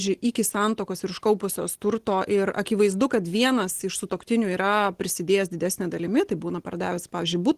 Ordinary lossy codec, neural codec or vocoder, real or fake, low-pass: Opus, 16 kbps; none; real; 14.4 kHz